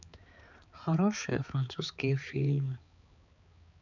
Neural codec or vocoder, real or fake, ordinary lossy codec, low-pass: codec, 16 kHz, 4 kbps, X-Codec, HuBERT features, trained on balanced general audio; fake; none; 7.2 kHz